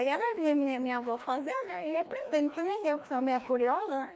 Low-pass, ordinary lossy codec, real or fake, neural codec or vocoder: none; none; fake; codec, 16 kHz, 1 kbps, FreqCodec, larger model